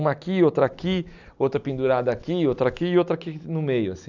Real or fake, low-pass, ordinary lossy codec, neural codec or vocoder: real; 7.2 kHz; none; none